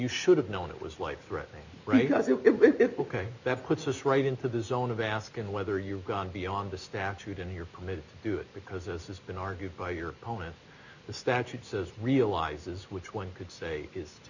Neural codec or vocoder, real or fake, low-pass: none; real; 7.2 kHz